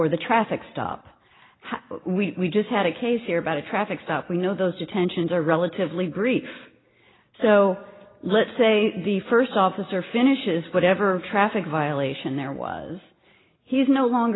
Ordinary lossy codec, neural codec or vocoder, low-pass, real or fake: AAC, 16 kbps; none; 7.2 kHz; real